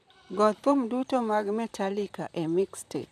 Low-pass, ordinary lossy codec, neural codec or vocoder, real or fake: 14.4 kHz; none; vocoder, 44.1 kHz, 128 mel bands, Pupu-Vocoder; fake